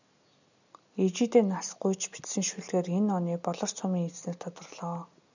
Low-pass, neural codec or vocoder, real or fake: 7.2 kHz; none; real